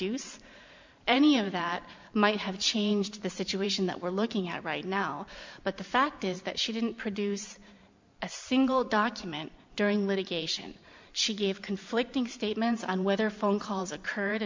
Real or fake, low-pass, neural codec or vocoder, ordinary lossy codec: fake; 7.2 kHz; vocoder, 44.1 kHz, 128 mel bands every 512 samples, BigVGAN v2; MP3, 64 kbps